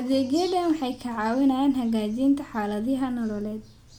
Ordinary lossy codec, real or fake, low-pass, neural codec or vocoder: MP3, 96 kbps; real; 19.8 kHz; none